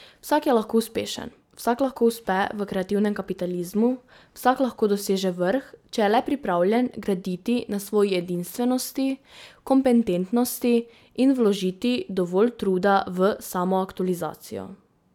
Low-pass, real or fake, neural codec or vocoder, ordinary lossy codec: 19.8 kHz; real; none; none